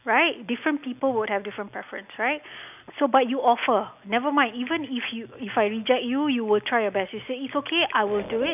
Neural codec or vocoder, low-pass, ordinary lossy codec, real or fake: none; 3.6 kHz; none; real